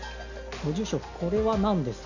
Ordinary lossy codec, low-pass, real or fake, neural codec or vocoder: none; 7.2 kHz; real; none